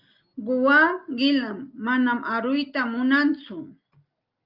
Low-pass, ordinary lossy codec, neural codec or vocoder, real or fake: 5.4 kHz; Opus, 24 kbps; none; real